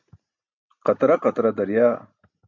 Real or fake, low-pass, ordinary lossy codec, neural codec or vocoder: real; 7.2 kHz; MP3, 64 kbps; none